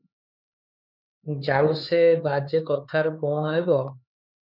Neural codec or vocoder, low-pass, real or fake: codec, 16 kHz, 4 kbps, X-Codec, HuBERT features, trained on LibriSpeech; 5.4 kHz; fake